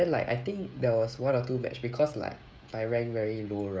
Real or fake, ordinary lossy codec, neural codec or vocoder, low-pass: fake; none; codec, 16 kHz, 16 kbps, FreqCodec, smaller model; none